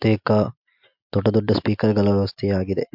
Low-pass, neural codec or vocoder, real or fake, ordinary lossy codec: 5.4 kHz; none; real; MP3, 48 kbps